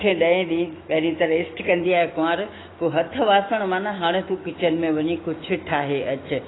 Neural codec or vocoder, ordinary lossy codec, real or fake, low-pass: autoencoder, 48 kHz, 128 numbers a frame, DAC-VAE, trained on Japanese speech; AAC, 16 kbps; fake; 7.2 kHz